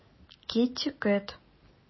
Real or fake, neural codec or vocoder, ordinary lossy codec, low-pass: real; none; MP3, 24 kbps; 7.2 kHz